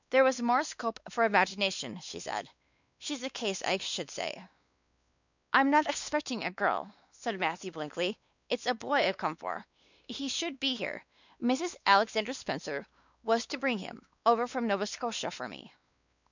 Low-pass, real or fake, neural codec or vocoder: 7.2 kHz; fake; codec, 16 kHz, 2 kbps, X-Codec, WavLM features, trained on Multilingual LibriSpeech